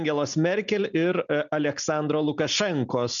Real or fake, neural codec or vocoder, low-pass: real; none; 7.2 kHz